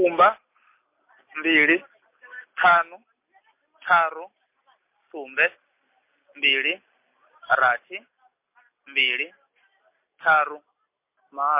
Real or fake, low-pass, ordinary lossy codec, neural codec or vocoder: real; 3.6 kHz; MP3, 24 kbps; none